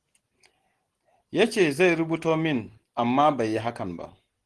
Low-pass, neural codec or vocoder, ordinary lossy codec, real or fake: 10.8 kHz; none; Opus, 16 kbps; real